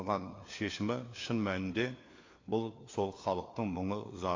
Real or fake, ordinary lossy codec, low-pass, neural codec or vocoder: fake; AAC, 32 kbps; 7.2 kHz; codec, 16 kHz, 4 kbps, FunCodec, trained on LibriTTS, 50 frames a second